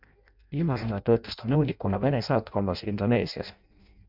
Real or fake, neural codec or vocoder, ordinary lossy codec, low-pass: fake; codec, 16 kHz in and 24 kHz out, 0.6 kbps, FireRedTTS-2 codec; MP3, 48 kbps; 5.4 kHz